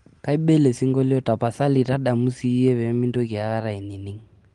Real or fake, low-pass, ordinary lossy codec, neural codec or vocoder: real; 10.8 kHz; Opus, 24 kbps; none